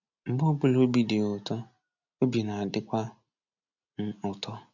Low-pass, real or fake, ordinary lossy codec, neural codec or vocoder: 7.2 kHz; real; none; none